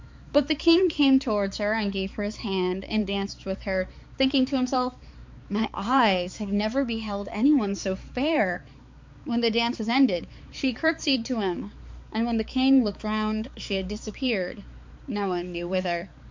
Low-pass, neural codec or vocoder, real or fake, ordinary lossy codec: 7.2 kHz; codec, 16 kHz, 4 kbps, X-Codec, HuBERT features, trained on balanced general audio; fake; MP3, 64 kbps